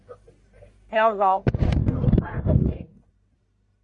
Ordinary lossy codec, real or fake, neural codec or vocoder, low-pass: MP3, 48 kbps; fake; codec, 44.1 kHz, 1.7 kbps, Pupu-Codec; 10.8 kHz